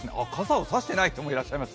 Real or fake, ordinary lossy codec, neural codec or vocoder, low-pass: real; none; none; none